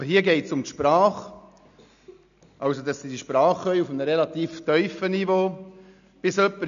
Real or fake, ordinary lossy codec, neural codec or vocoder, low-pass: real; none; none; 7.2 kHz